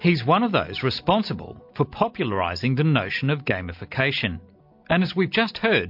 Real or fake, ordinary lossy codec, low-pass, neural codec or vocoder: real; MP3, 48 kbps; 5.4 kHz; none